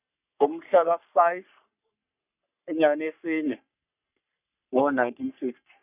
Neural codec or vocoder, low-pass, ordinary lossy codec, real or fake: codec, 44.1 kHz, 3.4 kbps, Pupu-Codec; 3.6 kHz; none; fake